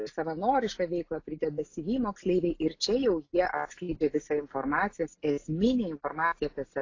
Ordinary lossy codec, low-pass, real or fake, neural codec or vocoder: AAC, 32 kbps; 7.2 kHz; real; none